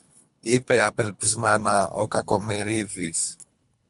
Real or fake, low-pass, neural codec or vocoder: fake; 10.8 kHz; codec, 24 kHz, 3 kbps, HILCodec